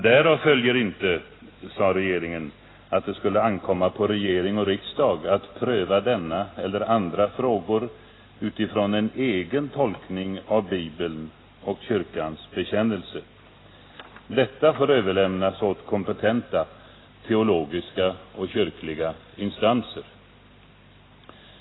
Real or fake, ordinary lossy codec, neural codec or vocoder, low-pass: real; AAC, 16 kbps; none; 7.2 kHz